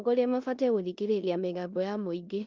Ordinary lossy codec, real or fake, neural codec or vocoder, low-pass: Opus, 32 kbps; fake; codec, 16 kHz in and 24 kHz out, 0.9 kbps, LongCat-Audio-Codec, four codebook decoder; 7.2 kHz